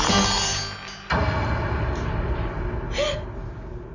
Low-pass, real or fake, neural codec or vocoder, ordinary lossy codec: 7.2 kHz; real; none; none